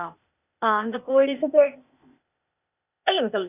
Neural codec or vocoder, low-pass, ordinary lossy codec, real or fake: codec, 16 kHz, 0.8 kbps, ZipCodec; 3.6 kHz; none; fake